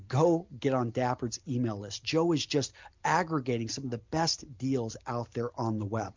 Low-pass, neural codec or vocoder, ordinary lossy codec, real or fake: 7.2 kHz; none; MP3, 64 kbps; real